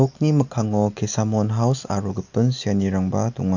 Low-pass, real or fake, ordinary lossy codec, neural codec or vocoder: 7.2 kHz; real; none; none